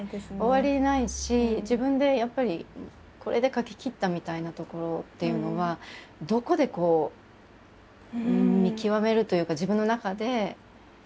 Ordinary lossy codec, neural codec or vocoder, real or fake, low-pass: none; none; real; none